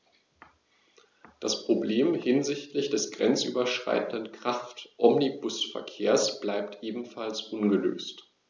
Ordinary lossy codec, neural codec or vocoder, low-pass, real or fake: none; none; none; real